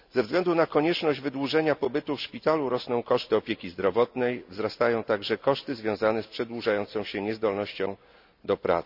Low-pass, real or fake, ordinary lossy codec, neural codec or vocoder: 5.4 kHz; real; none; none